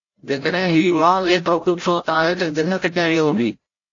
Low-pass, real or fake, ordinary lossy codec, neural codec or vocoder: 7.2 kHz; fake; AAC, 48 kbps; codec, 16 kHz, 0.5 kbps, FreqCodec, larger model